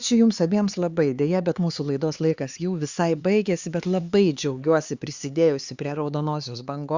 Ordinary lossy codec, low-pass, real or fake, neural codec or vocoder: Opus, 64 kbps; 7.2 kHz; fake; codec, 16 kHz, 4 kbps, X-Codec, HuBERT features, trained on LibriSpeech